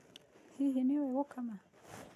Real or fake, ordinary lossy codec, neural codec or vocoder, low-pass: real; none; none; 14.4 kHz